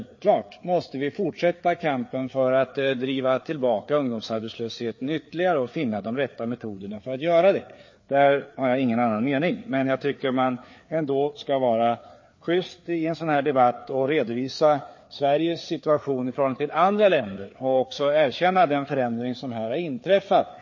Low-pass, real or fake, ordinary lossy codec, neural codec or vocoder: 7.2 kHz; fake; MP3, 32 kbps; codec, 16 kHz, 4 kbps, FreqCodec, larger model